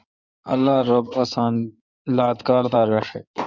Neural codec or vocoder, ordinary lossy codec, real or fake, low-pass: codec, 16 kHz in and 24 kHz out, 2.2 kbps, FireRedTTS-2 codec; Opus, 64 kbps; fake; 7.2 kHz